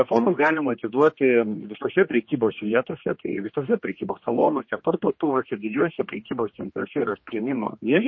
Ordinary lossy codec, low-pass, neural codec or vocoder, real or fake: MP3, 32 kbps; 7.2 kHz; codec, 16 kHz, 2 kbps, X-Codec, HuBERT features, trained on general audio; fake